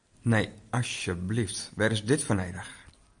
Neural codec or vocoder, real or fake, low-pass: none; real; 9.9 kHz